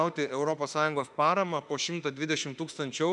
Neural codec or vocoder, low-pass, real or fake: autoencoder, 48 kHz, 32 numbers a frame, DAC-VAE, trained on Japanese speech; 10.8 kHz; fake